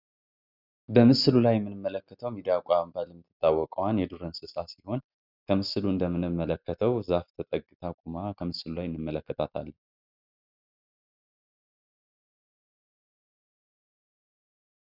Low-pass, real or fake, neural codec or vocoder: 5.4 kHz; real; none